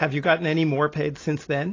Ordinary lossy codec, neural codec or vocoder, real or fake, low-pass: AAC, 32 kbps; none; real; 7.2 kHz